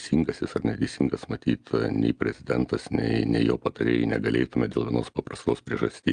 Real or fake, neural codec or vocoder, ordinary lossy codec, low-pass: real; none; Opus, 32 kbps; 9.9 kHz